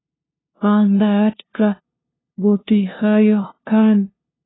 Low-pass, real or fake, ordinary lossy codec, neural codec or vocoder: 7.2 kHz; fake; AAC, 16 kbps; codec, 16 kHz, 0.5 kbps, FunCodec, trained on LibriTTS, 25 frames a second